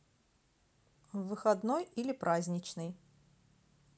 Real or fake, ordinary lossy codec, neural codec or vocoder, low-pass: real; none; none; none